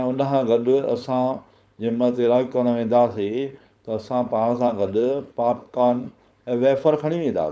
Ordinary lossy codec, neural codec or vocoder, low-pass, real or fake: none; codec, 16 kHz, 4.8 kbps, FACodec; none; fake